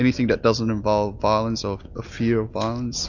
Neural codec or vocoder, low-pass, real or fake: none; 7.2 kHz; real